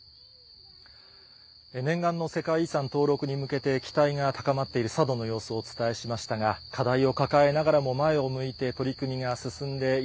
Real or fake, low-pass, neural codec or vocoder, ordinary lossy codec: real; none; none; none